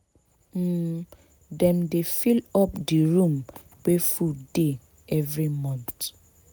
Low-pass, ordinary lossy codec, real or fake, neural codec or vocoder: none; none; real; none